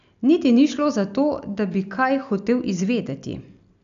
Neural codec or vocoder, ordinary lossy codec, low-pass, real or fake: none; AAC, 96 kbps; 7.2 kHz; real